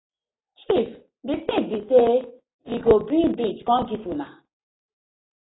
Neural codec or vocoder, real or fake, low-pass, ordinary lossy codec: none; real; 7.2 kHz; AAC, 16 kbps